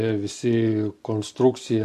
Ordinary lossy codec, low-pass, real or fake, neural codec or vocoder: MP3, 64 kbps; 14.4 kHz; real; none